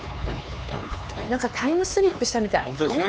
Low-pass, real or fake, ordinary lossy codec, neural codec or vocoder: none; fake; none; codec, 16 kHz, 4 kbps, X-Codec, HuBERT features, trained on LibriSpeech